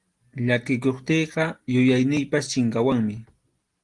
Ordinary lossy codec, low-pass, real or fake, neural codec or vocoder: Opus, 24 kbps; 10.8 kHz; real; none